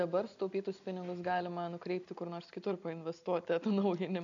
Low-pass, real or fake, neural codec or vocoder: 7.2 kHz; real; none